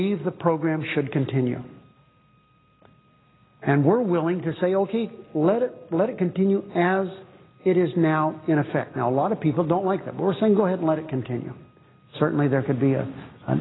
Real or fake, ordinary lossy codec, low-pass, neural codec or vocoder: real; AAC, 16 kbps; 7.2 kHz; none